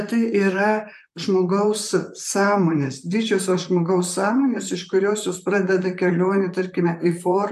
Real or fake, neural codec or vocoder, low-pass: fake; vocoder, 44.1 kHz, 128 mel bands, Pupu-Vocoder; 14.4 kHz